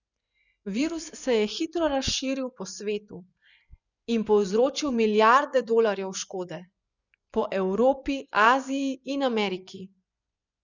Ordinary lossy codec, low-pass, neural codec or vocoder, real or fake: none; 7.2 kHz; vocoder, 24 kHz, 100 mel bands, Vocos; fake